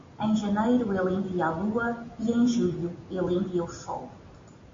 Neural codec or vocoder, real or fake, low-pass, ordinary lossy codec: none; real; 7.2 kHz; MP3, 48 kbps